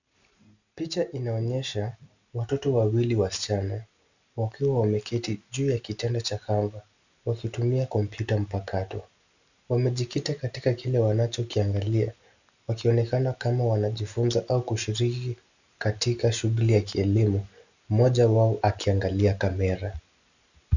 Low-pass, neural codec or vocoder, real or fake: 7.2 kHz; none; real